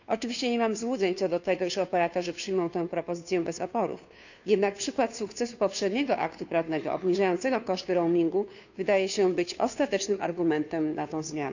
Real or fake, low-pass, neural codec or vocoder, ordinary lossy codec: fake; 7.2 kHz; codec, 16 kHz, 2 kbps, FunCodec, trained on Chinese and English, 25 frames a second; none